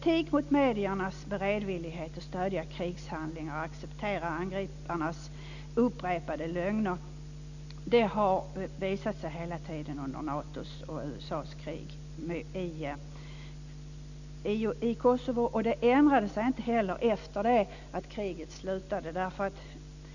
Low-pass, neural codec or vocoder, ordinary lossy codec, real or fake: 7.2 kHz; none; none; real